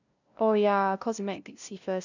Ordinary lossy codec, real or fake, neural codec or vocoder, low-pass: none; fake; codec, 16 kHz, 0.5 kbps, FunCodec, trained on LibriTTS, 25 frames a second; 7.2 kHz